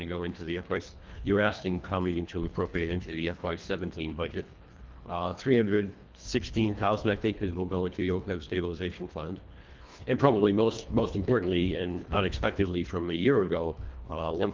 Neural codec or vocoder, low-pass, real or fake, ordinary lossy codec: codec, 24 kHz, 1.5 kbps, HILCodec; 7.2 kHz; fake; Opus, 32 kbps